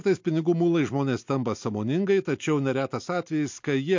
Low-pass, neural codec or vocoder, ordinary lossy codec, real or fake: 7.2 kHz; none; MP3, 48 kbps; real